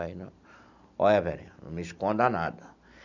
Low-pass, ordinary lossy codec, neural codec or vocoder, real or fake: 7.2 kHz; none; none; real